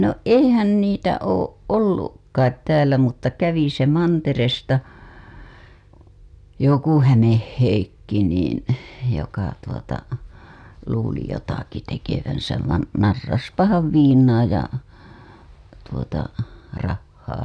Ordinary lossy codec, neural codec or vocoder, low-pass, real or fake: none; none; none; real